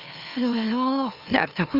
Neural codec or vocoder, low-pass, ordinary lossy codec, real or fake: autoencoder, 44.1 kHz, a latent of 192 numbers a frame, MeloTTS; 5.4 kHz; Opus, 32 kbps; fake